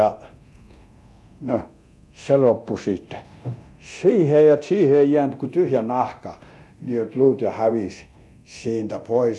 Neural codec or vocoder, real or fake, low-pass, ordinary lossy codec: codec, 24 kHz, 0.9 kbps, DualCodec; fake; none; none